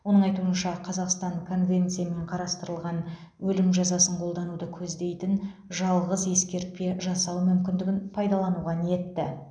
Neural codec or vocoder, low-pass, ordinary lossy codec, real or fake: none; 9.9 kHz; none; real